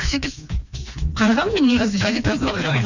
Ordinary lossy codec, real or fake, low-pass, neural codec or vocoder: none; fake; 7.2 kHz; codec, 16 kHz, 2 kbps, FreqCodec, smaller model